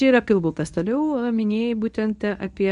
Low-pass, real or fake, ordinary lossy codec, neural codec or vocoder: 10.8 kHz; fake; MP3, 96 kbps; codec, 24 kHz, 0.9 kbps, WavTokenizer, medium speech release version 1